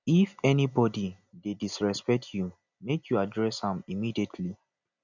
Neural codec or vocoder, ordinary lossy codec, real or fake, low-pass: none; none; real; 7.2 kHz